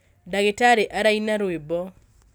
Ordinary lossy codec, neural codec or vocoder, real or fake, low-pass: none; none; real; none